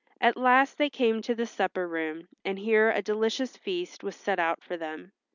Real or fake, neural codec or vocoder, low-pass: real; none; 7.2 kHz